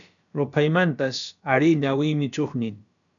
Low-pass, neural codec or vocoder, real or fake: 7.2 kHz; codec, 16 kHz, about 1 kbps, DyCAST, with the encoder's durations; fake